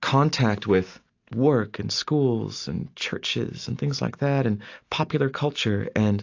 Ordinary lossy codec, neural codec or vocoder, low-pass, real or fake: AAC, 48 kbps; none; 7.2 kHz; real